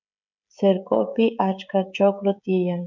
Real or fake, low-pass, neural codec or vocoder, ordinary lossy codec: fake; 7.2 kHz; codec, 16 kHz, 16 kbps, FreqCodec, smaller model; MP3, 64 kbps